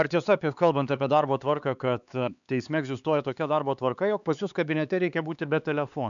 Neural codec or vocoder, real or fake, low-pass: codec, 16 kHz, 4 kbps, X-Codec, HuBERT features, trained on LibriSpeech; fake; 7.2 kHz